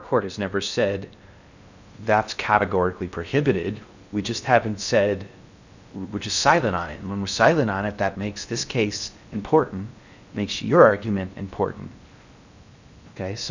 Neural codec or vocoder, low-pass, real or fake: codec, 16 kHz in and 24 kHz out, 0.6 kbps, FocalCodec, streaming, 2048 codes; 7.2 kHz; fake